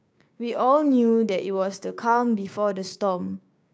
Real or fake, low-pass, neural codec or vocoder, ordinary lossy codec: fake; none; codec, 16 kHz, 6 kbps, DAC; none